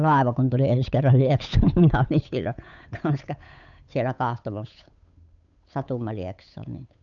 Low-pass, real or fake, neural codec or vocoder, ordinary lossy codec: 7.2 kHz; fake; codec, 16 kHz, 8 kbps, FunCodec, trained on Chinese and English, 25 frames a second; none